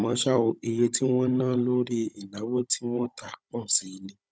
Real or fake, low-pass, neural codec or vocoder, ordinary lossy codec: fake; none; codec, 16 kHz, 16 kbps, FunCodec, trained on Chinese and English, 50 frames a second; none